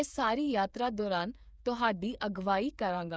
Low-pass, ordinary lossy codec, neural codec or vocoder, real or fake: none; none; codec, 16 kHz, 8 kbps, FreqCodec, smaller model; fake